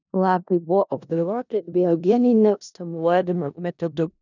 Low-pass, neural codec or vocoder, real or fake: 7.2 kHz; codec, 16 kHz in and 24 kHz out, 0.4 kbps, LongCat-Audio-Codec, four codebook decoder; fake